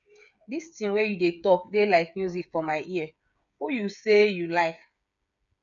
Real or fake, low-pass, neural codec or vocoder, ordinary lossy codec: fake; 7.2 kHz; codec, 16 kHz, 8 kbps, FreqCodec, smaller model; none